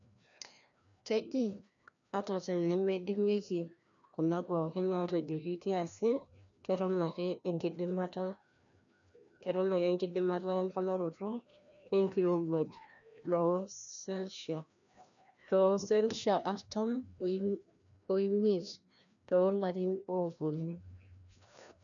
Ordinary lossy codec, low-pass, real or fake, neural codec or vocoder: none; 7.2 kHz; fake; codec, 16 kHz, 1 kbps, FreqCodec, larger model